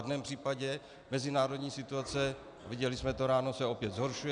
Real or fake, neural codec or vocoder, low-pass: real; none; 9.9 kHz